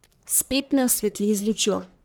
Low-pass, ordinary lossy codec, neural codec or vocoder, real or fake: none; none; codec, 44.1 kHz, 1.7 kbps, Pupu-Codec; fake